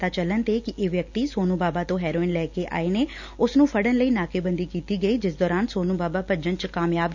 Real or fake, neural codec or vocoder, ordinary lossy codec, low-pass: real; none; none; 7.2 kHz